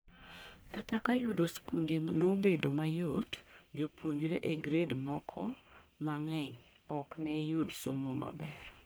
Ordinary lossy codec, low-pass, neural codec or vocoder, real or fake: none; none; codec, 44.1 kHz, 1.7 kbps, Pupu-Codec; fake